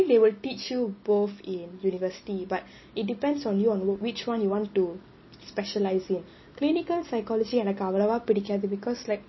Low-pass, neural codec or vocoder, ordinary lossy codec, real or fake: 7.2 kHz; none; MP3, 24 kbps; real